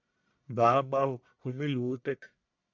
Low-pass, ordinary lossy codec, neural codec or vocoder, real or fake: 7.2 kHz; MP3, 64 kbps; codec, 44.1 kHz, 1.7 kbps, Pupu-Codec; fake